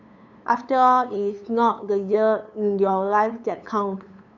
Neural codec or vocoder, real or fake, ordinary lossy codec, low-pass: codec, 16 kHz, 8 kbps, FunCodec, trained on LibriTTS, 25 frames a second; fake; none; 7.2 kHz